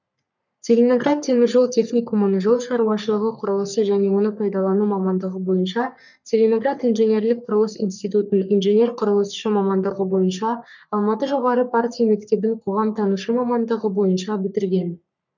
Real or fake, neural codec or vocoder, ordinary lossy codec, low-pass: fake; codec, 44.1 kHz, 3.4 kbps, Pupu-Codec; none; 7.2 kHz